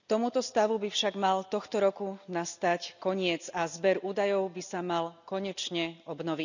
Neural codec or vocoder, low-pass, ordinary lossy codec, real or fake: none; 7.2 kHz; none; real